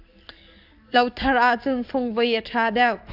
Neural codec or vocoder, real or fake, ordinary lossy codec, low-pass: codec, 44.1 kHz, 7.8 kbps, DAC; fake; AAC, 48 kbps; 5.4 kHz